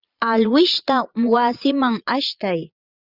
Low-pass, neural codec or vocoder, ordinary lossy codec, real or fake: 5.4 kHz; vocoder, 22.05 kHz, 80 mel bands, Vocos; Opus, 64 kbps; fake